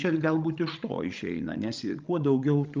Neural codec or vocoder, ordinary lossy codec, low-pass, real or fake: codec, 16 kHz, 16 kbps, FreqCodec, larger model; Opus, 32 kbps; 7.2 kHz; fake